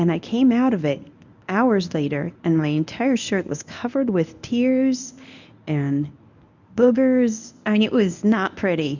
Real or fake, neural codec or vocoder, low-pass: fake; codec, 24 kHz, 0.9 kbps, WavTokenizer, medium speech release version 1; 7.2 kHz